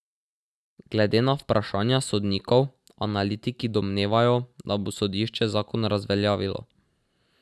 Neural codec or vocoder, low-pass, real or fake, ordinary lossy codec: none; none; real; none